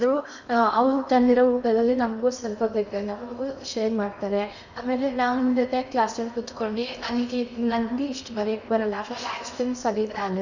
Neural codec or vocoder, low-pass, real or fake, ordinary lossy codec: codec, 16 kHz in and 24 kHz out, 0.8 kbps, FocalCodec, streaming, 65536 codes; 7.2 kHz; fake; none